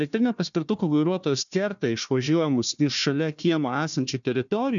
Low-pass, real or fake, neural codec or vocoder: 7.2 kHz; fake; codec, 16 kHz, 1 kbps, FunCodec, trained on Chinese and English, 50 frames a second